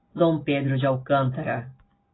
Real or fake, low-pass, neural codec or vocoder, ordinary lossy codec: real; 7.2 kHz; none; AAC, 16 kbps